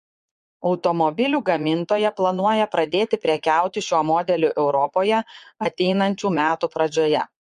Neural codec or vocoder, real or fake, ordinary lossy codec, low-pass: vocoder, 22.05 kHz, 80 mel bands, Vocos; fake; MP3, 64 kbps; 9.9 kHz